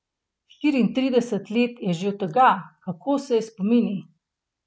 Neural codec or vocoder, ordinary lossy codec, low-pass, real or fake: none; none; none; real